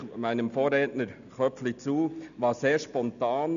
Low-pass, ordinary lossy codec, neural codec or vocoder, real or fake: 7.2 kHz; none; none; real